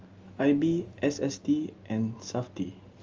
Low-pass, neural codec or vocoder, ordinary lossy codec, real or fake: 7.2 kHz; none; Opus, 32 kbps; real